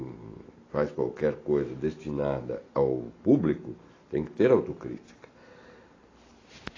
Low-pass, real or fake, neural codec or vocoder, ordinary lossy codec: 7.2 kHz; real; none; AAC, 32 kbps